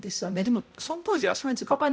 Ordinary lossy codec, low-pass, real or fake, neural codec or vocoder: none; none; fake; codec, 16 kHz, 0.5 kbps, X-Codec, HuBERT features, trained on balanced general audio